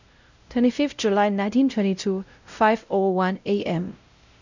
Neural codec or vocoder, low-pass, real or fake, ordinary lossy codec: codec, 16 kHz, 0.5 kbps, X-Codec, WavLM features, trained on Multilingual LibriSpeech; 7.2 kHz; fake; none